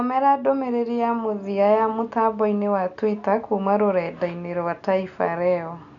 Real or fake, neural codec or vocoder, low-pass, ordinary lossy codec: real; none; 7.2 kHz; none